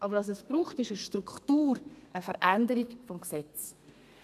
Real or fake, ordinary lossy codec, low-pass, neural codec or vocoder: fake; none; 14.4 kHz; codec, 32 kHz, 1.9 kbps, SNAC